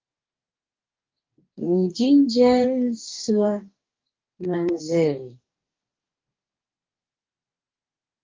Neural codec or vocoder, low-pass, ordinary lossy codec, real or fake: codec, 44.1 kHz, 2.6 kbps, DAC; 7.2 kHz; Opus, 24 kbps; fake